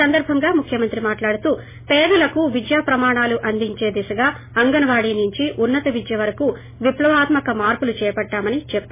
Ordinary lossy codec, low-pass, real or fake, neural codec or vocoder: MP3, 16 kbps; 3.6 kHz; real; none